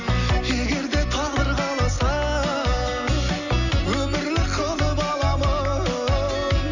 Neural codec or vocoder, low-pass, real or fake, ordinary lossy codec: none; 7.2 kHz; real; none